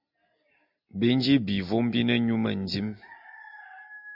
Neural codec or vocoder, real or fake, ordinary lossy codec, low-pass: none; real; MP3, 48 kbps; 5.4 kHz